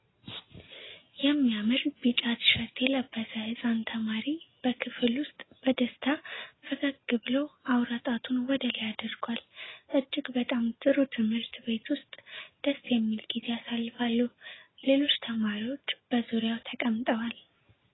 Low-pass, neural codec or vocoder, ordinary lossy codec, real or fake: 7.2 kHz; none; AAC, 16 kbps; real